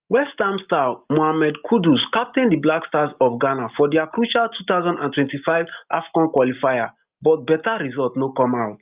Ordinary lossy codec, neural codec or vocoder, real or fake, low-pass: Opus, 24 kbps; none; real; 3.6 kHz